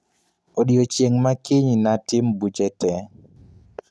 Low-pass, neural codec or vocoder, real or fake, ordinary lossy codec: none; none; real; none